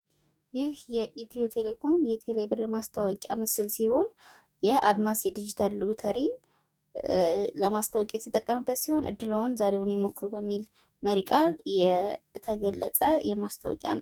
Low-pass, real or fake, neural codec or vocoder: 19.8 kHz; fake; codec, 44.1 kHz, 2.6 kbps, DAC